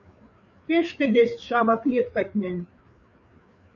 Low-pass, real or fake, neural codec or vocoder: 7.2 kHz; fake; codec, 16 kHz, 4 kbps, FreqCodec, larger model